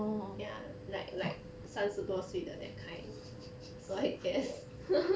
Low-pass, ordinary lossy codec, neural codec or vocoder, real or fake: none; none; none; real